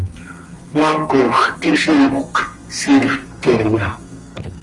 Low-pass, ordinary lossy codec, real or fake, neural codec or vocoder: 10.8 kHz; Opus, 24 kbps; fake; codec, 32 kHz, 1.9 kbps, SNAC